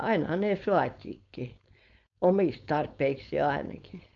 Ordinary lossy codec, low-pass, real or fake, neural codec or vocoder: none; 7.2 kHz; fake; codec, 16 kHz, 4.8 kbps, FACodec